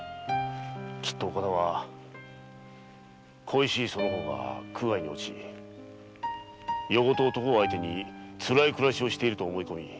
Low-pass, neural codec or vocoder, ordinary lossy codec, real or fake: none; none; none; real